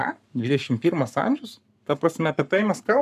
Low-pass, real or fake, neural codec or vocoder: 14.4 kHz; fake; codec, 44.1 kHz, 7.8 kbps, Pupu-Codec